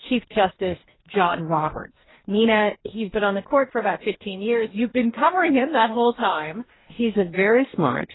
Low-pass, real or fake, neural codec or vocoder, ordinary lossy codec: 7.2 kHz; fake; codec, 44.1 kHz, 2.6 kbps, DAC; AAC, 16 kbps